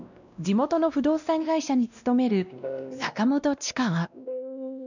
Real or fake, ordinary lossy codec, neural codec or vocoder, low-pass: fake; none; codec, 16 kHz, 1 kbps, X-Codec, WavLM features, trained on Multilingual LibriSpeech; 7.2 kHz